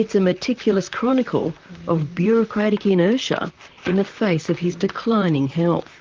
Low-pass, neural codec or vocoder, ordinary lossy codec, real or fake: 7.2 kHz; vocoder, 44.1 kHz, 128 mel bands, Pupu-Vocoder; Opus, 24 kbps; fake